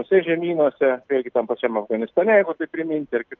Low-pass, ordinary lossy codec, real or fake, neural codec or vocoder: 7.2 kHz; Opus, 24 kbps; real; none